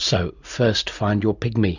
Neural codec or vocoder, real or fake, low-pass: none; real; 7.2 kHz